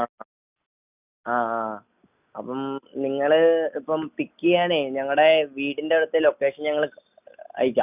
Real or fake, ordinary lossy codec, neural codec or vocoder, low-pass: real; none; none; 3.6 kHz